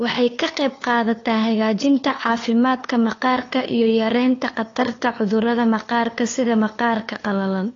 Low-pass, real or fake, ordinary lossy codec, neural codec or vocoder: 7.2 kHz; fake; AAC, 32 kbps; codec, 16 kHz, 2 kbps, FunCodec, trained on LibriTTS, 25 frames a second